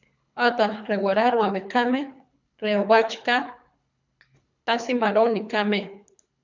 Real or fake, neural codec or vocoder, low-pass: fake; codec, 24 kHz, 3 kbps, HILCodec; 7.2 kHz